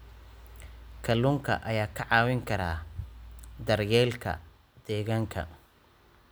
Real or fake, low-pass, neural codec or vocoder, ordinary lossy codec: real; none; none; none